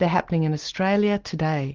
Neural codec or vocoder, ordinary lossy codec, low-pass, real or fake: none; Opus, 16 kbps; 7.2 kHz; real